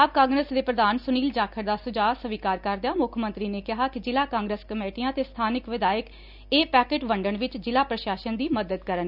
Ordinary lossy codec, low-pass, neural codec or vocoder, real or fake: none; 5.4 kHz; none; real